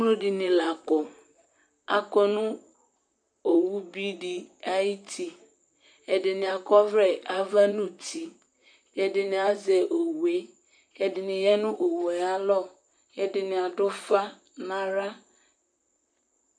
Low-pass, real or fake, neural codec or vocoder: 9.9 kHz; fake; vocoder, 44.1 kHz, 128 mel bands, Pupu-Vocoder